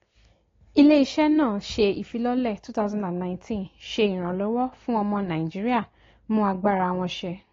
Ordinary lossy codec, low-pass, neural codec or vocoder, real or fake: AAC, 32 kbps; 7.2 kHz; none; real